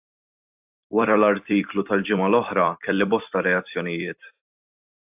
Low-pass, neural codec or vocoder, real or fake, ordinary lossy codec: 3.6 kHz; vocoder, 44.1 kHz, 128 mel bands every 256 samples, BigVGAN v2; fake; AAC, 32 kbps